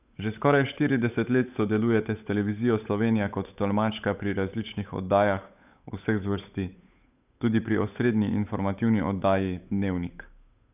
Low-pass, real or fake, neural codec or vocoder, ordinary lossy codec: 3.6 kHz; fake; codec, 16 kHz, 8 kbps, FunCodec, trained on Chinese and English, 25 frames a second; none